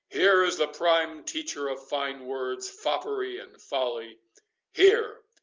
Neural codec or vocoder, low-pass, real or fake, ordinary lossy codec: none; 7.2 kHz; real; Opus, 32 kbps